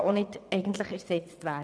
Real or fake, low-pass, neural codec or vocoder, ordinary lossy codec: fake; none; vocoder, 22.05 kHz, 80 mel bands, WaveNeXt; none